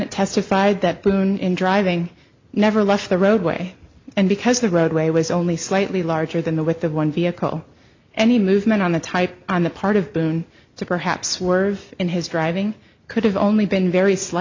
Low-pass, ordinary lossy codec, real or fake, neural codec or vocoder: 7.2 kHz; MP3, 64 kbps; real; none